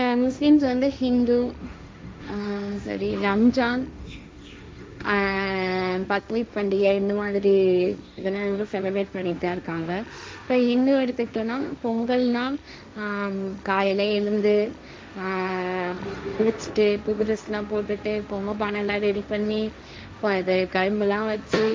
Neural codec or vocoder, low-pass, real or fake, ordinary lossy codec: codec, 16 kHz, 1.1 kbps, Voila-Tokenizer; none; fake; none